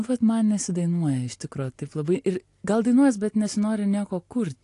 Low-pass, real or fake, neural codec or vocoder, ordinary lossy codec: 10.8 kHz; real; none; AAC, 48 kbps